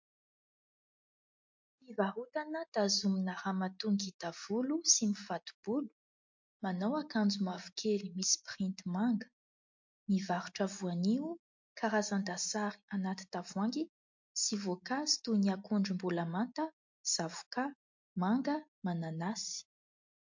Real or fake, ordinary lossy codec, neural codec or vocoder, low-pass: real; MP3, 48 kbps; none; 7.2 kHz